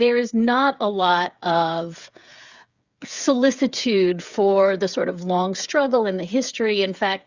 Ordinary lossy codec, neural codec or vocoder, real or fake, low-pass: Opus, 64 kbps; codec, 16 kHz, 8 kbps, FreqCodec, smaller model; fake; 7.2 kHz